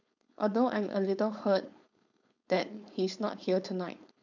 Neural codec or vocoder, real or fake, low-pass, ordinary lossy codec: codec, 16 kHz, 4.8 kbps, FACodec; fake; 7.2 kHz; none